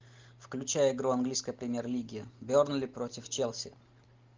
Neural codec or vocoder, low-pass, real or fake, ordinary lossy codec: none; 7.2 kHz; real; Opus, 32 kbps